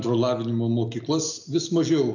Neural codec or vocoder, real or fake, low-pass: none; real; 7.2 kHz